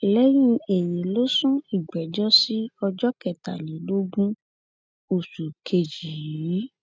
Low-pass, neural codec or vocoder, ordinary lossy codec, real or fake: none; none; none; real